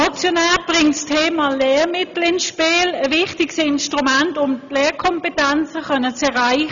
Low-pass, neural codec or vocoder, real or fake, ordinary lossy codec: 7.2 kHz; none; real; none